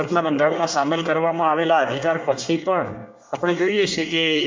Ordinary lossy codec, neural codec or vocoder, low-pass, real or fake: MP3, 64 kbps; codec, 24 kHz, 1 kbps, SNAC; 7.2 kHz; fake